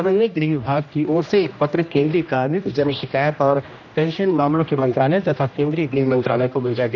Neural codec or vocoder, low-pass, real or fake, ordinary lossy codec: codec, 16 kHz, 1 kbps, X-Codec, HuBERT features, trained on general audio; 7.2 kHz; fake; none